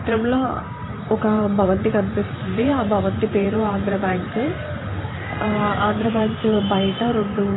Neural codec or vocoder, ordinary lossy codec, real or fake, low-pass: vocoder, 44.1 kHz, 128 mel bands every 512 samples, BigVGAN v2; AAC, 16 kbps; fake; 7.2 kHz